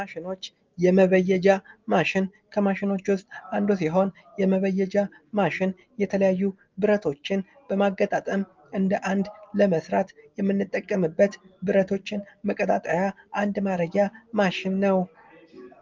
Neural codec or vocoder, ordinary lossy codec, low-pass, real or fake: none; Opus, 24 kbps; 7.2 kHz; real